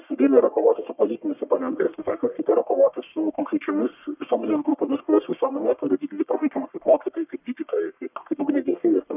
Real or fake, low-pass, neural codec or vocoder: fake; 3.6 kHz; codec, 44.1 kHz, 1.7 kbps, Pupu-Codec